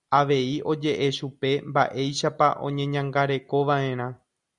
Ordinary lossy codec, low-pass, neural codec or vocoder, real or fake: Opus, 64 kbps; 10.8 kHz; none; real